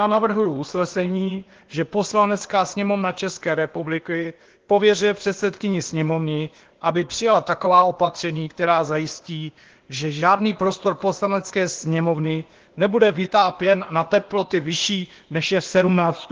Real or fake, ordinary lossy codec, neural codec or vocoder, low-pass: fake; Opus, 16 kbps; codec, 16 kHz, 0.8 kbps, ZipCodec; 7.2 kHz